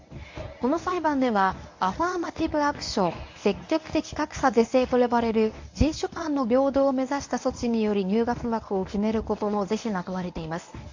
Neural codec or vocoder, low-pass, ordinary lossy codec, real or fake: codec, 24 kHz, 0.9 kbps, WavTokenizer, medium speech release version 1; 7.2 kHz; AAC, 48 kbps; fake